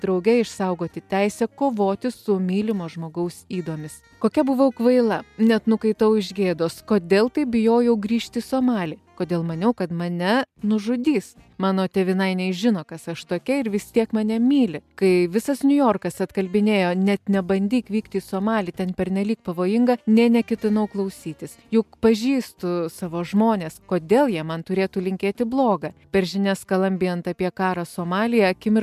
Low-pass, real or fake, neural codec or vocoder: 14.4 kHz; real; none